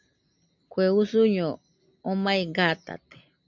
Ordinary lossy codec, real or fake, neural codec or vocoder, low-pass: MP3, 64 kbps; real; none; 7.2 kHz